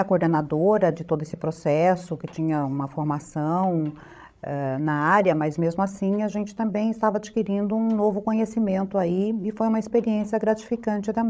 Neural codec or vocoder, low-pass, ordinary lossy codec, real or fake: codec, 16 kHz, 16 kbps, FreqCodec, larger model; none; none; fake